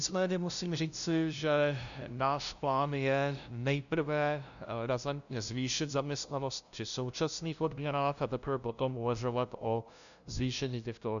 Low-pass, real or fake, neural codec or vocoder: 7.2 kHz; fake; codec, 16 kHz, 0.5 kbps, FunCodec, trained on LibriTTS, 25 frames a second